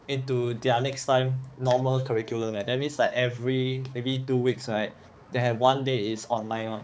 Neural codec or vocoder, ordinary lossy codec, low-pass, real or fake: codec, 16 kHz, 4 kbps, X-Codec, HuBERT features, trained on balanced general audio; none; none; fake